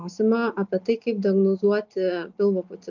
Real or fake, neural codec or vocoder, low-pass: real; none; 7.2 kHz